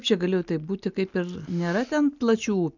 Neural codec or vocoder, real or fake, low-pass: none; real; 7.2 kHz